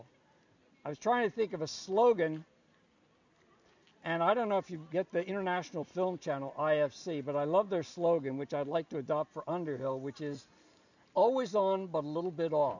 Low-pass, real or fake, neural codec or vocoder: 7.2 kHz; real; none